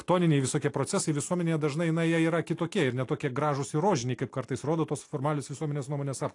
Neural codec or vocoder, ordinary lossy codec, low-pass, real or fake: none; AAC, 48 kbps; 10.8 kHz; real